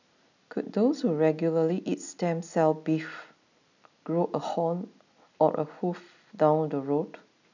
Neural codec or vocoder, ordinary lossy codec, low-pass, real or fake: none; none; 7.2 kHz; real